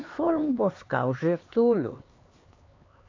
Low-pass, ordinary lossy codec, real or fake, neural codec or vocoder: 7.2 kHz; AAC, 32 kbps; fake; codec, 16 kHz, 4 kbps, X-Codec, HuBERT features, trained on LibriSpeech